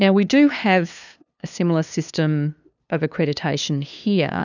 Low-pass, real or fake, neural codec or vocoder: 7.2 kHz; fake; codec, 16 kHz, 2 kbps, FunCodec, trained on LibriTTS, 25 frames a second